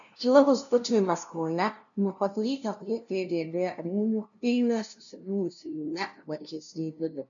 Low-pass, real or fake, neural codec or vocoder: 7.2 kHz; fake; codec, 16 kHz, 0.5 kbps, FunCodec, trained on LibriTTS, 25 frames a second